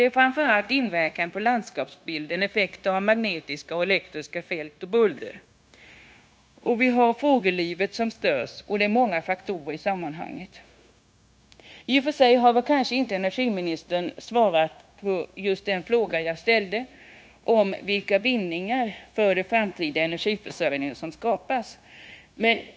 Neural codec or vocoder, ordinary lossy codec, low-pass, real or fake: codec, 16 kHz, 0.9 kbps, LongCat-Audio-Codec; none; none; fake